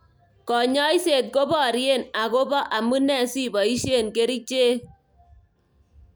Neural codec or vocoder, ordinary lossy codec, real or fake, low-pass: none; none; real; none